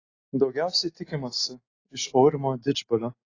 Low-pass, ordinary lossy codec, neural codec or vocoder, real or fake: 7.2 kHz; AAC, 32 kbps; none; real